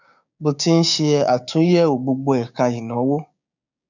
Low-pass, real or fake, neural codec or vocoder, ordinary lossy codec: 7.2 kHz; fake; codec, 16 kHz, 6 kbps, DAC; none